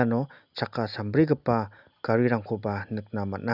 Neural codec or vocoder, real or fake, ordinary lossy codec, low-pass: none; real; none; 5.4 kHz